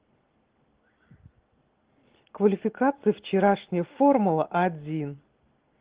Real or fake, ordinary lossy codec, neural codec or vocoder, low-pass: real; Opus, 16 kbps; none; 3.6 kHz